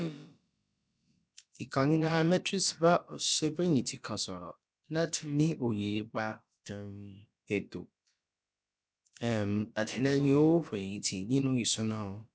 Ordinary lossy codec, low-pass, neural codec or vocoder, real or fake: none; none; codec, 16 kHz, about 1 kbps, DyCAST, with the encoder's durations; fake